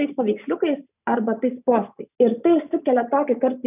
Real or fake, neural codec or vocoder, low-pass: real; none; 3.6 kHz